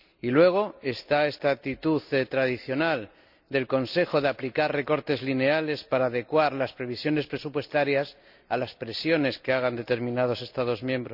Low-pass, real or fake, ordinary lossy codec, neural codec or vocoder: 5.4 kHz; real; none; none